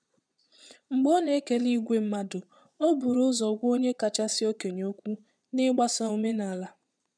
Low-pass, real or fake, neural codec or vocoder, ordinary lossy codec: 9.9 kHz; fake; vocoder, 22.05 kHz, 80 mel bands, Vocos; none